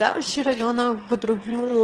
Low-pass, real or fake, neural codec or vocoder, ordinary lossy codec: 9.9 kHz; fake; autoencoder, 22.05 kHz, a latent of 192 numbers a frame, VITS, trained on one speaker; Opus, 16 kbps